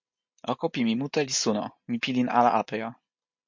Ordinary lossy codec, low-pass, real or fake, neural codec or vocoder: MP3, 48 kbps; 7.2 kHz; real; none